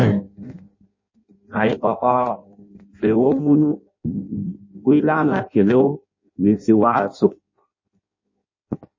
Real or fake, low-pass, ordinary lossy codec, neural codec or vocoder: fake; 7.2 kHz; MP3, 32 kbps; codec, 16 kHz in and 24 kHz out, 0.6 kbps, FireRedTTS-2 codec